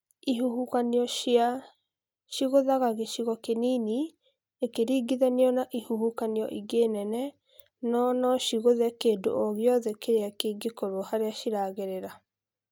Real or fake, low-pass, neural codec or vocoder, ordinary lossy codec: real; 19.8 kHz; none; none